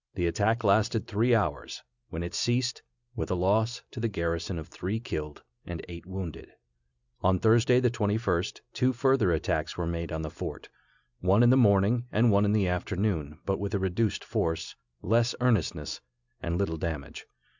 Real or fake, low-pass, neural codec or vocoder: real; 7.2 kHz; none